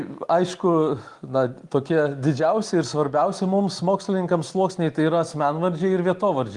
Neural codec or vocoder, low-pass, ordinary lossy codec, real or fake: none; 10.8 kHz; Opus, 24 kbps; real